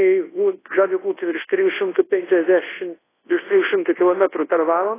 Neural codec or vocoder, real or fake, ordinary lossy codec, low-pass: codec, 24 kHz, 0.9 kbps, WavTokenizer, large speech release; fake; AAC, 16 kbps; 3.6 kHz